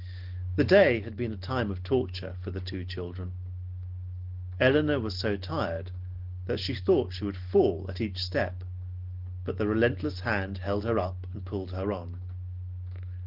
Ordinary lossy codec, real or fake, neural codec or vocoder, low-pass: Opus, 16 kbps; real; none; 5.4 kHz